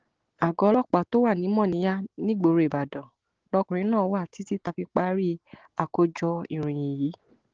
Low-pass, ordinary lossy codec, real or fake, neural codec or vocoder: 7.2 kHz; Opus, 16 kbps; real; none